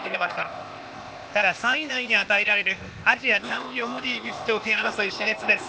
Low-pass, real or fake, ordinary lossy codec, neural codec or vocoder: none; fake; none; codec, 16 kHz, 0.8 kbps, ZipCodec